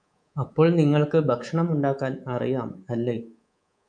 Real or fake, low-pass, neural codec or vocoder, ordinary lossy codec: fake; 9.9 kHz; codec, 24 kHz, 3.1 kbps, DualCodec; MP3, 96 kbps